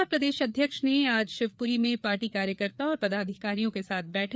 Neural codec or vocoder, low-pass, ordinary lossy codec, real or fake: codec, 16 kHz, 4 kbps, FreqCodec, larger model; none; none; fake